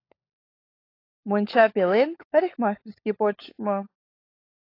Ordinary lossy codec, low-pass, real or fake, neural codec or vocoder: AAC, 32 kbps; 5.4 kHz; fake; codec, 16 kHz, 16 kbps, FunCodec, trained on LibriTTS, 50 frames a second